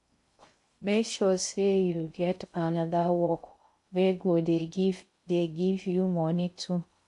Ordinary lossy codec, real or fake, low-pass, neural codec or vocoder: AAC, 64 kbps; fake; 10.8 kHz; codec, 16 kHz in and 24 kHz out, 0.6 kbps, FocalCodec, streaming, 2048 codes